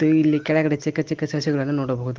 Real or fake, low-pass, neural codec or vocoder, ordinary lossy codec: real; 7.2 kHz; none; Opus, 16 kbps